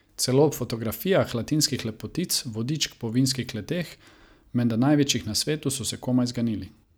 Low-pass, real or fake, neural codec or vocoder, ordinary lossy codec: none; real; none; none